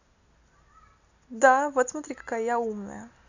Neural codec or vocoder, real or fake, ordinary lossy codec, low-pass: none; real; none; 7.2 kHz